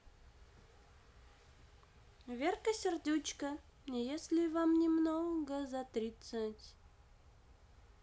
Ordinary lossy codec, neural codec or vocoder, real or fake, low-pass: none; none; real; none